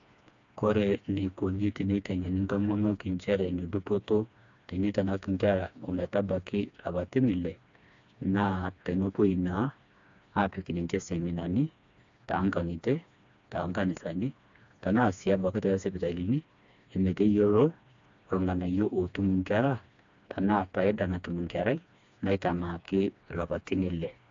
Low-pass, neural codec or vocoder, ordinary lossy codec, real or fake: 7.2 kHz; codec, 16 kHz, 2 kbps, FreqCodec, smaller model; AAC, 64 kbps; fake